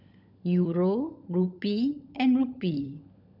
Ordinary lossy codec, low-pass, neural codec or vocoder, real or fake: none; 5.4 kHz; codec, 16 kHz, 16 kbps, FunCodec, trained on LibriTTS, 50 frames a second; fake